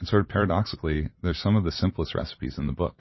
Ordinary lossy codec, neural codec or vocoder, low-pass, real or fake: MP3, 24 kbps; vocoder, 44.1 kHz, 128 mel bands every 256 samples, BigVGAN v2; 7.2 kHz; fake